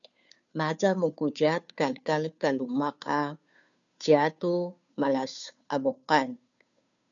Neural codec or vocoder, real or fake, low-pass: codec, 16 kHz, 2 kbps, FunCodec, trained on Chinese and English, 25 frames a second; fake; 7.2 kHz